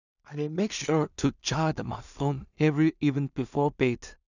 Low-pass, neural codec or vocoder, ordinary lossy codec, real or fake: 7.2 kHz; codec, 16 kHz in and 24 kHz out, 0.4 kbps, LongCat-Audio-Codec, two codebook decoder; none; fake